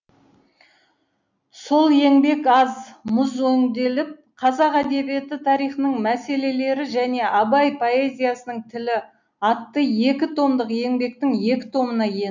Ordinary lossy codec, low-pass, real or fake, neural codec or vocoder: none; 7.2 kHz; real; none